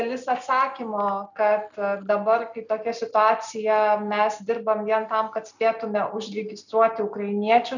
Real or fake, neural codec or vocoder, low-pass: real; none; 7.2 kHz